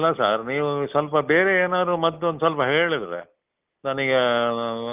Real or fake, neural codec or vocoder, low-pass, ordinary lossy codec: real; none; 3.6 kHz; Opus, 32 kbps